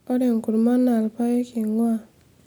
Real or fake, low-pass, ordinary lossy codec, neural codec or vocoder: real; none; none; none